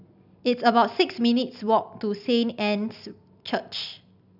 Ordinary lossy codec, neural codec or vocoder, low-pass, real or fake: none; none; 5.4 kHz; real